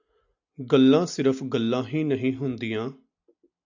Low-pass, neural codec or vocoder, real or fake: 7.2 kHz; none; real